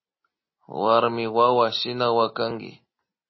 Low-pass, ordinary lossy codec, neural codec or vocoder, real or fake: 7.2 kHz; MP3, 24 kbps; none; real